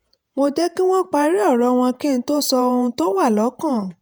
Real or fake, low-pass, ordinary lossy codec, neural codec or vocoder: fake; none; none; vocoder, 48 kHz, 128 mel bands, Vocos